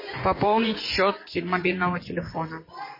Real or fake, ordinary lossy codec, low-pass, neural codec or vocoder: fake; MP3, 24 kbps; 5.4 kHz; vocoder, 24 kHz, 100 mel bands, Vocos